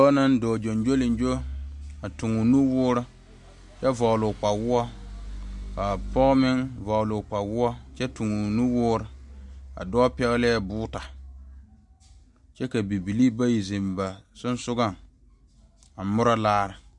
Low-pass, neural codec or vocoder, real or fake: 10.8 kHz; none; real